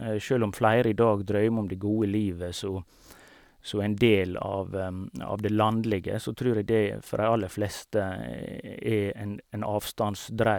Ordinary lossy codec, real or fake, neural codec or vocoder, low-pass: none; real; none; 19.8 kHz